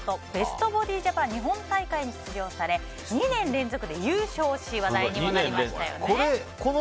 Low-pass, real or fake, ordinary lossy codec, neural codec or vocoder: none; real; none; none